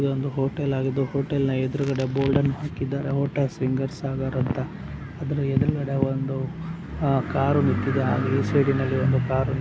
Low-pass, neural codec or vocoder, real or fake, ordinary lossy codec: none; none; real; none